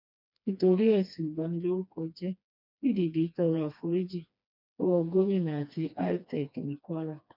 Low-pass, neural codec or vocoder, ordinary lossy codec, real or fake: 5.4 kHz; codec, 16 kHz, 2 kbps, FreqCodec, smaller model; none; fake